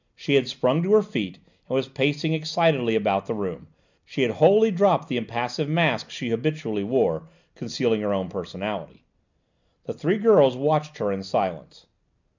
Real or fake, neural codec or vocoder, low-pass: real; none; 7.2 kHz